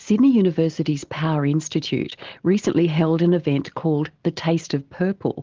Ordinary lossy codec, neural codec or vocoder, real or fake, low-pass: Opus, 16 kbps; none; real; 7.2 kHz